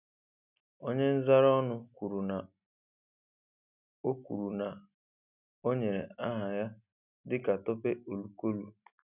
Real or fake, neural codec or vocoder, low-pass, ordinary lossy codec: real; none; 3.6 kHz; none